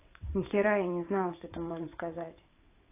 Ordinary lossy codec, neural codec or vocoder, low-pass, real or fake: AAC, 24 kbps; vocoder, 22.05 kHz, 80 mel bands, WaveNeXt; 3.6 kHz; fake